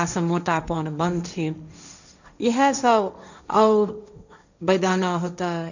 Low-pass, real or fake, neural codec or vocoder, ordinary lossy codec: 7.2 kHz; fake; codec, 16 kHz, 1.1 kbps, Voila-Tokenizer; none